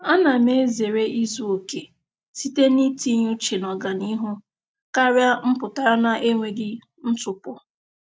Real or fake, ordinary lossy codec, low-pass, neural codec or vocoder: real; none; none; none